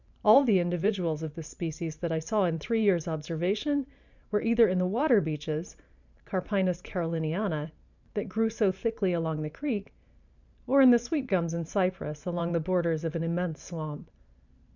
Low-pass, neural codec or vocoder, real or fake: 7.2 kHz; vocoder, 44.1 kHz, 128 mel bands every 512 samples, BigVGAN v2; fake